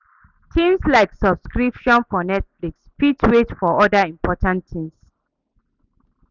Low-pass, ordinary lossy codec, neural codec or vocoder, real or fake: 7.2 kHz; none; none; real